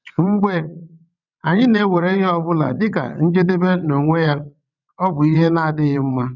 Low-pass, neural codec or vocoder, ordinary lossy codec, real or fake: 7.2 kHz; vocoder, 22.05 kHz, 80 mel bands, WaveNeXt; none; fake